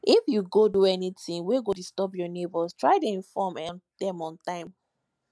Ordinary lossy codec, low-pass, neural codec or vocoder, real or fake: none; none; none; real